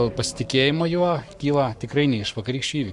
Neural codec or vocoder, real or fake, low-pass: codec, 44.1 kHz, 7.8 kbps, Pupu-Codec; fake; 10.8 kHz